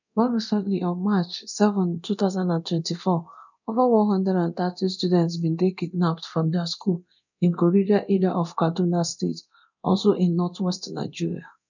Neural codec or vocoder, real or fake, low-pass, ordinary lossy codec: codec, 24 kHz, 0.9 kbps, DualCodec; fake; 7.2 kHz; none